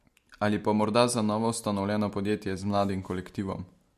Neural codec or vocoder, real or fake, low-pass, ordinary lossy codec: none; real; 14.4 kHz; MP3, 64 kbps